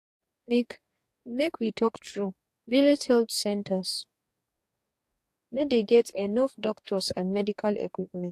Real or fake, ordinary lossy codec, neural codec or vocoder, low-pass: fake; AAC, 64 kbps; codec, 44.1 kHz, 2.6 kbps, DAC; 14.4 kHz